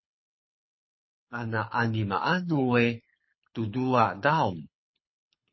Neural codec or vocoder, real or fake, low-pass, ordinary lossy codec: codec, 24 kHz, 6 kbps, HILCodec; fake; 7.2 kHz; MP3, 24 kbps